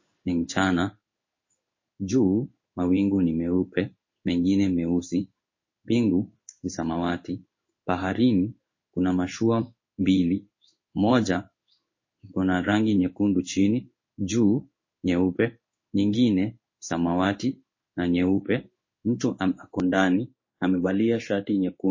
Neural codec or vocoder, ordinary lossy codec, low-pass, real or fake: codec, 16 kHz in and 24 kHz out, 1 kbps, XY-Tokenizer; MP3, 32 kbps; 7.2 kHz; fake